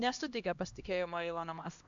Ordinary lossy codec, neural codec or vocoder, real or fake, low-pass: AAC, 64 kbps; codec, 16 kHz, 1 kbps, X-Codec, HuBERT features, trained on LibriSpeech; fake; 7.2 kHz